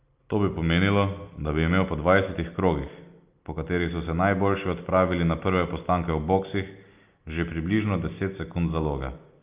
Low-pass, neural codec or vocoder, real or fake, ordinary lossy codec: 3.6 kHz; none; real; Opus, 24 kbps